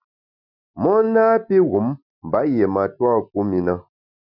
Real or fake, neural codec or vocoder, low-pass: real; none; 5.4 kHz